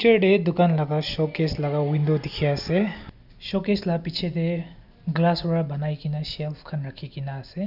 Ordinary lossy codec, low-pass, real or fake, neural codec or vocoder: none; 5.4 kHz; real; none